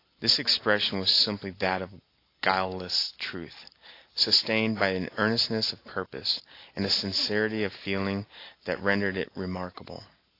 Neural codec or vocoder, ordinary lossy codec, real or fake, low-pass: none; AAC, 32 kbps; real; 5.4 kHz